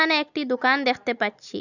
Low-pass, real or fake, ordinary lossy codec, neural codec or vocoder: 7.2 kHz; real; none; none